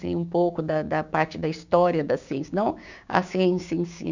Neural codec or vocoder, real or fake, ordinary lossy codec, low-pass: codec, 16 kHz, 6 kbps, DAC; fake; none; 7.2 kHz